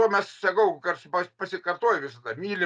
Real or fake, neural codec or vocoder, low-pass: real; none; 9.9 kHz